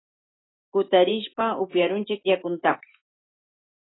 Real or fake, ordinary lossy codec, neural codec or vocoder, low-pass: real; AAC, 16 kbps; none; 7.2 kHz